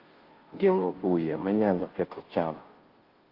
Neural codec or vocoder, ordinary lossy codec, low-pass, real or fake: codec, 16 kHz, 0.5 kbps, FunCodec, trained on Chinese and English, 25 frames a second; Opus, 16 kbps; 5.4 kHz; fake